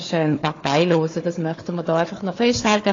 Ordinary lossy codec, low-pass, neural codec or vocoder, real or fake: AAC, 32 kbps; 7.2 kHz; codec, 16 kHz, 4 kbps, FunCodec, trained on Chinese and English, 50 frames a second; fake